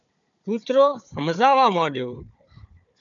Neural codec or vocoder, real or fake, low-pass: codec, 16 kHz, 4 kbps, FunCodec, trained on Chinese and English, 50 frames a second; fake; 7.2 kHz